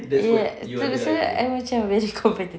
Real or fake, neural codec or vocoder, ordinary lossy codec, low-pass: real; none; none; none